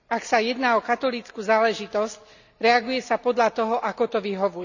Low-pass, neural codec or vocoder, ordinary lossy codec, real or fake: 7.2 kHz; none; none; real